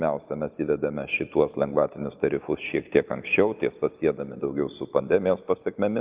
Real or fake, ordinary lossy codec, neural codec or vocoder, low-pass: real; Opus, 24 kbps; none; 3.6 kHz